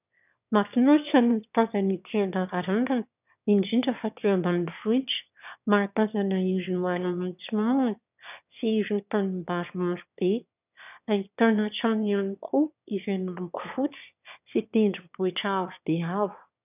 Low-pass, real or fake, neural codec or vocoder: 3.6 kHz; fake; autoencoder, 22.05 kHz, a latent of 192 numbers a frame, VITS, trained on one speaker